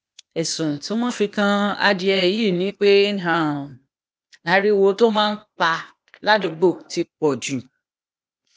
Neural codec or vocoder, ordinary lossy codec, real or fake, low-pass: codec, 16 kHz, 0.8 kbps, ZipCodec; none; fake; none